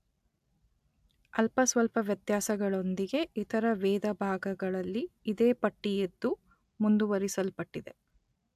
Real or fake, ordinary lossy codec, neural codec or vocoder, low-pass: real; AAC, 96 kbps; none; 14.4 kHz